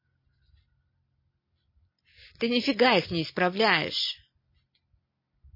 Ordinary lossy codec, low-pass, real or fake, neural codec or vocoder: MP3, 24 kbps; 5.4 kHz; real; none